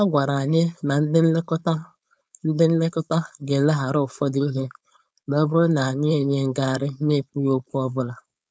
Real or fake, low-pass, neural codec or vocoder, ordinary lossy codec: fake; none; codec, 16 kHz, 4.8 kbps, FACodec; none